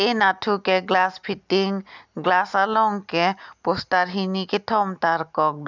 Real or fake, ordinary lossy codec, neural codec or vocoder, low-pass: real; none; none; 7.2 kHz